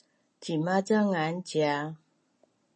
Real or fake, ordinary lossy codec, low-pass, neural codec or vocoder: real; MP3, 32 kbps; 10.8 kHz; none